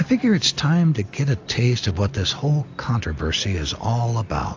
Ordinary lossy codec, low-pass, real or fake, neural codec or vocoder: AAC, 48 kbps; 7.2 kHz; real; none